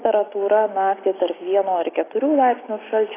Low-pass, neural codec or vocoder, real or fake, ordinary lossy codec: 3.6 kHz; none; real; AAC, 16 kbps